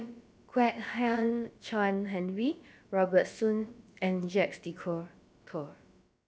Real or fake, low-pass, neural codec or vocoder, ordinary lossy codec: fake; none; codec, 16 kHz, about 1 kbps, DyCAST, with the encoder's durations; none